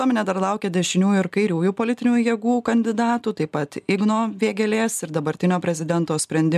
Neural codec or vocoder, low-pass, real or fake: none; 14.4 kHz; real